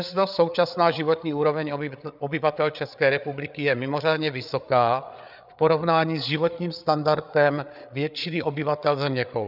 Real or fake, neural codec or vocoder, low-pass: fake; codec, 16 kHz, 8 kbps, FreqCodec, larger model; 5.4 kHz